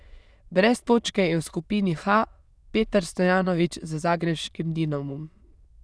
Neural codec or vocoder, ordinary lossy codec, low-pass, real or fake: autoencoder, 22.05 kHz, a latent of 192 numbers a frame, VITS, trained on many speakers; none; none; fake